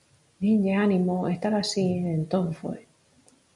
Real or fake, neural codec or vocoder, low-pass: real; none; 10.8 kHz